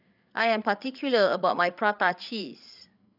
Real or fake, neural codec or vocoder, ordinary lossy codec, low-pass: fake; codec, 16 kHz, 8 kbps, FreqCodec, larger model; none; 5.4 kHz